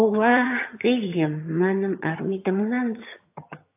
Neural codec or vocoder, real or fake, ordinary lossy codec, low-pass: vocoder, 22.05 kHz, 80 mel bands, HiFi-GAN; fake; AAC, 32 kbps; 3.6 kHz